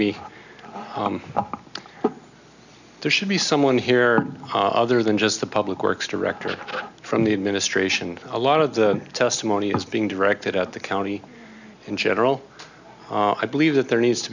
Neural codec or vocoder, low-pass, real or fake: none; 7.2 kHz; real